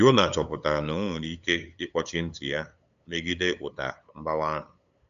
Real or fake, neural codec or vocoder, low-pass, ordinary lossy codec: fake; codec, 16 kHz, 8 kbps, FunCodec, trained on LibriTTS, 25 frames a second; 7.2 kHz; AAC, 96 kbps